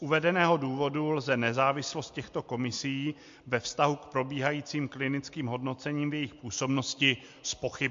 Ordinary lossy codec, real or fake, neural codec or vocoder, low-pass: MP3, 48 kbps; real; none; 7.2 kHz